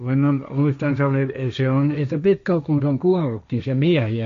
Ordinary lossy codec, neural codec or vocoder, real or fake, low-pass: MP3, 48 kbps; codec, 16 kHz, 1.1 kbps, Voila-Tokenizer; fake; 7.2 kHz